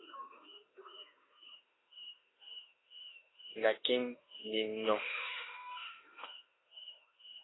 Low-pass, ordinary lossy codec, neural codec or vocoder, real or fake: 7.2 kHz; AAC, 16 kbps; autoencoder, 48 kHz, 32 numbers a frame, DAC-VAE, trained on Japanese speech; fake